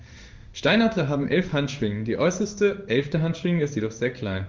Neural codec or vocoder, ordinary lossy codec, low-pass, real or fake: codec, 16 kHz in and 24 kHz out, 1 kbps, XY-Tokenizer; Opus, 32 kbps; 7.2 kHz; fake